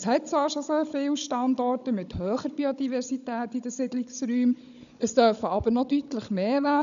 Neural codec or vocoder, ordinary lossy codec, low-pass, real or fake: codec, 16 kHz, 16 kbps, FunCodec, trained on Chinese and English, 50 frames a second; none; 7.2 kHz; fake